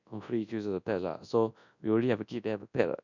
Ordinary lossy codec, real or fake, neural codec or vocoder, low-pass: none; fake; codec, 24 kHz, 0.9 kbps, WavTokenizer, large speech release; 7.2 kHz